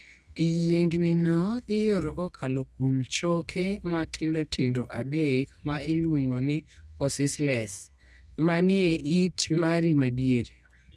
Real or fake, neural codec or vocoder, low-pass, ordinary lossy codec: fake; codec, 24 kHz, 0.9 kbps, WavTokenizer, medium music audio release; none; none